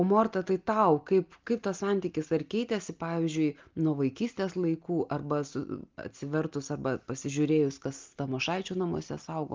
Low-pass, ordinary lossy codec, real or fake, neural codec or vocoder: 7.2 kHz; Opus, 32 kbps; real; none